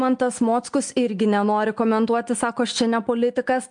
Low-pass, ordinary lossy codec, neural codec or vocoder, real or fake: 9.9 kHz; MP3, 64 kbps; none; real